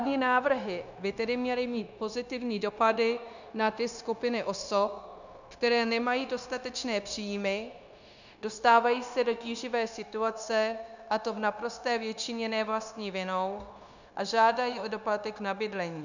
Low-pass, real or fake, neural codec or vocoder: 7.2 kHz; fake; codec, 16 kHz, 0.9 kbps, LongCat-Audio-Codec